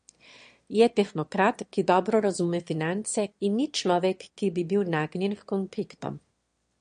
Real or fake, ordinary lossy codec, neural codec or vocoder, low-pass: fake; MP3, 48 kbps; autoencoder, 22.05 kHz, a latent of 192 numbers a frame, VITS, trained on one speaker; 9.9 kHz